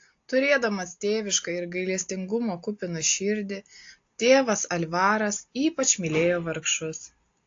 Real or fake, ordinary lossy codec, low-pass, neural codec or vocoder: real; AAC, 48 kbps; 7.2 kHz; none